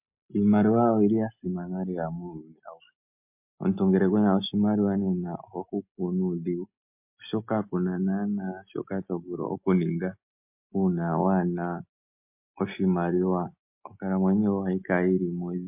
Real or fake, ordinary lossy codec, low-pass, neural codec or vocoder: real; MP3, 32 kbps; 3.6 kHz; none